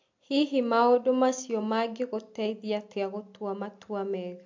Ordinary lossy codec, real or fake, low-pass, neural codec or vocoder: MP3, 48 kbps; real; 7.2 kHz; none